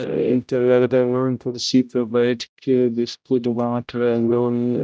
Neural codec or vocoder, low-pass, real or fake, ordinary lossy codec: codec, 16 kHz, 0.5 kbps, X-Codec, HuBERT features, trained on general audio; none; fake; none